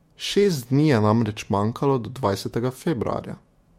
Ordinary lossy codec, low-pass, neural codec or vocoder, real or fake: MP3, 64 kbps; 19.8 kHz; autoencoder, 48 kHz, 128 numbers a frame, DAC-VAE, trained on Japanese speech; fake